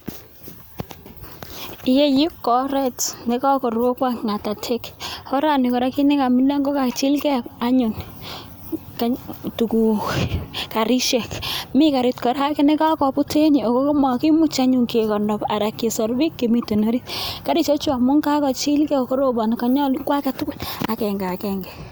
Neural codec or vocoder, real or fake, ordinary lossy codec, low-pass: none; real; none; none